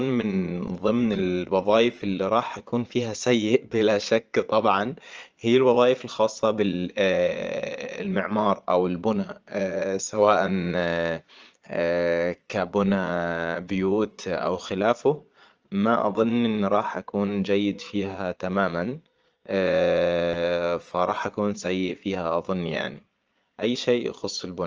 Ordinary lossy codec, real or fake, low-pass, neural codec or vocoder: Opus, 24 kbps; fake; 7.2 kHz; vocoder, 22.05 kHz, 80 mel bands, Vocos